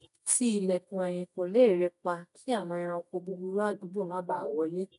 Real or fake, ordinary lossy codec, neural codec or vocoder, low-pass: fake; none; codec, 24 kHz, 0.9 kbps, WavTokenizer, medium music audio release; 10.8 kHz